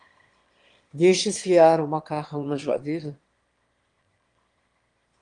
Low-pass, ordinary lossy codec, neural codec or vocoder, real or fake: 9.9 kHz; Opus, 24 kbps; autoencoder, 22.05 kHz, a latent of 192 numbers a frame, VITS, trained on one speaker; fake